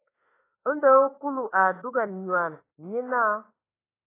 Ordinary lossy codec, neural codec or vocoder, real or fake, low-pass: AAC, 16 kbps; autoencoder, 48 kHz, 32 numbers a frame, DAC-VAE, trained on Japanese speech; fake; 3.6 kHz